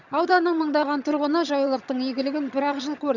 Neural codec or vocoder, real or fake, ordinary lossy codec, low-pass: vocoder, 22.05 kHz, 80 mel bands, HiFi-GAN; fake; none; 7.2 kHz